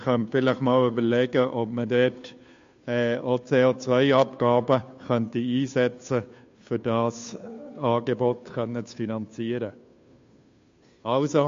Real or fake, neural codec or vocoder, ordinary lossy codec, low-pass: fake; codec, 16 kHz, 2 kbps, FunCodec, trained on Chinese and English, 25 frames a second; MP3, 48 kbps; 7.2 kHz